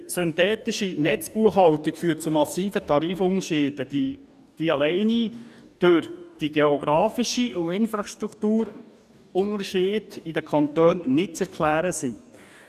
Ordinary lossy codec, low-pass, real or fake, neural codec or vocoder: none; 14.4 kHz; fake; codec, 44.1 kHz, 2.6 kbps, DAC